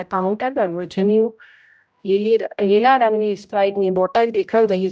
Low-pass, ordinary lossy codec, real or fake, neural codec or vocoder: none; none; fake; codec, 16 kHz, 0.5 kbps, X-Codec, HuBERT features, trained on general audio